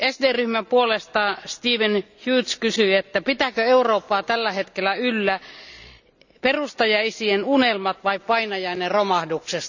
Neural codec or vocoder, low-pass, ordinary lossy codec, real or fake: none; 7.2 kHz; none; real